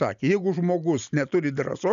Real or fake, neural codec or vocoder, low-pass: real; none; 7.2 kHz